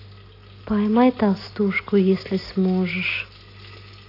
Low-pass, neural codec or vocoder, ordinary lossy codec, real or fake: 5.4 kHz; none; AAC, 32 kbps; real